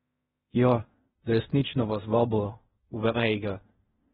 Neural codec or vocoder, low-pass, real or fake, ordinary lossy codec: codec, 16 kHz in and 24 kHz out, 0.4 kbps, LongCat-Audio-Codec, fine tuned four codebook decoder; 10.8 kHz; fake; AAC, 16 kbps